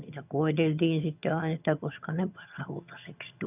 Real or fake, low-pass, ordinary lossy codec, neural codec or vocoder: fake; 3.6 kHz; none; vocoder, 22.05 kHz, 80 mel bands, HiFi-GAN